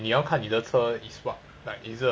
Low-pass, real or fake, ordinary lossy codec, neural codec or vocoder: none; real; none; none